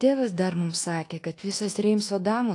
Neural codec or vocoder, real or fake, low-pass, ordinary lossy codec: codec, 24 kHz, 1.2 kbps, DualCodec; fake; 10.8 kHz; AAC, 48 kbps